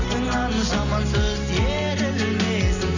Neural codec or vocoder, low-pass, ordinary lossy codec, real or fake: none; 7.2 kHz; none; real